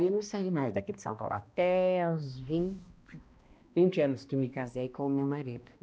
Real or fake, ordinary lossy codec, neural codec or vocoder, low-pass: fake; none; codec, 16 kHz, 1 kbps, X-Codec, HuBERT features, trained on balanced general audio; none